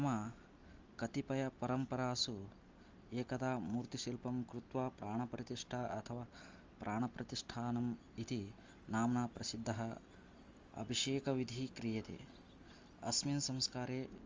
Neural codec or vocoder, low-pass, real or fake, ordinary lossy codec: none; 7.2 kHz; real; Opus, 32 kbps